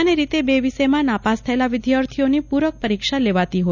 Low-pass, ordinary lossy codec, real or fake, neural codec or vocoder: 7.2 kHz; none; real; none